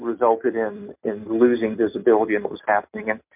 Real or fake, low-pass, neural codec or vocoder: real; 3.6 kHz; none